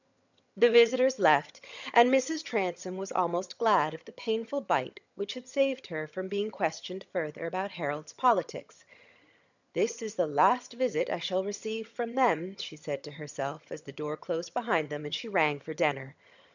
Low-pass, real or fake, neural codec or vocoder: 7.2 kHz; fake; vocoder, 22.05 kHz, 80 mel bands, HiFi-GAN